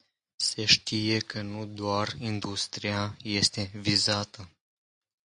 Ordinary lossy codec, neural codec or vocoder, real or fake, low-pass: Opus, 64 kbps; none; real; 9.9 kHz